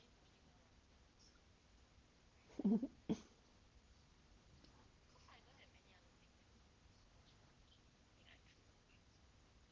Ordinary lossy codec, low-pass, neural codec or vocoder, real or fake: Opus, 16 kbps; 7.2 kHz; none; real